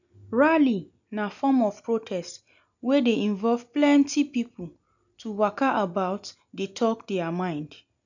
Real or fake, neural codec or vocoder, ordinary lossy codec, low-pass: real; none; none; 7.2 kHz